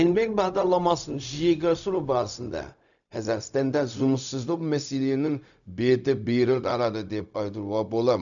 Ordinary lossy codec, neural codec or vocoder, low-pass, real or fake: none; codec, 16 kHz, 0.4 kbps, LongCat-Audio-Codec; 7.2 kHz; fake